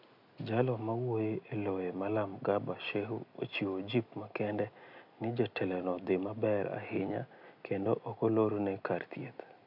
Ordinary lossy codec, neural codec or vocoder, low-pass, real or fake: none; none; 5.4 kHz; real